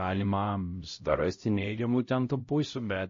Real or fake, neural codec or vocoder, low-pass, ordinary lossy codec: fake; codec, 16 kHz, 0.5 kbps, X-Codec, HuBERT features, trained on LibriSpeech; 7.2 kHz; MP3, 32 kbps